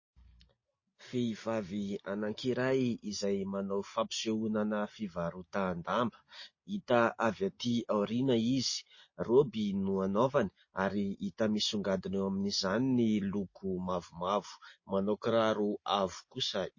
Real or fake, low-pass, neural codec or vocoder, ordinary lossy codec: real; 7.2 kHz; none; MP3, 32 kbps